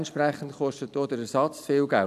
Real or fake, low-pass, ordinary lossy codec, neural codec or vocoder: real; 14.4 kHz; none; none